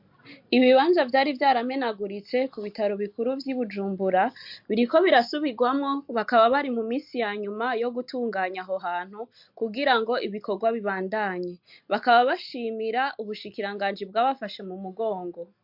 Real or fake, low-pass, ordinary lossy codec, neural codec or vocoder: real; 5.4 kHz; MP3, 48 kbps; none